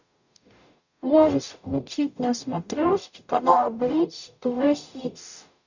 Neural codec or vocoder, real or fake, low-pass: codec, 44.1 kHz, 0.9 kbps, DAC; fake; 7.2 kHz